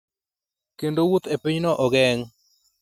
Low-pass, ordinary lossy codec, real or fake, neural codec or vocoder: 19.8 kHz; none; real; none